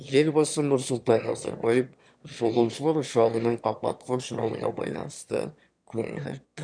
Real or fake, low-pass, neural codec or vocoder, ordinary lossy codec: fake; 9.9 kHz; autoencoder, 22.05 kHz, a latent of 192 numbers a frame, VITS, trained on one speaker; none